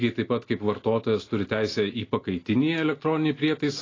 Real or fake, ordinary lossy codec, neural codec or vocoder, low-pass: real; AAC, 32 kbps; none; 7.2 kHz